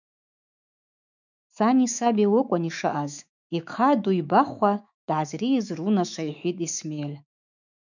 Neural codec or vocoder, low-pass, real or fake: autoencoder, 48 kHz, 128 numbers a frame, DAC-VAE, trained on Japanese speech; 7.2 kHz; fake